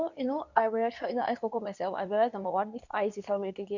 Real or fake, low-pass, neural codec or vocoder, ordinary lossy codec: fake; 7.2 kHz; codec, 24 kHz, 0.9 kbps, WavTokenizer, medium speech release version 2; none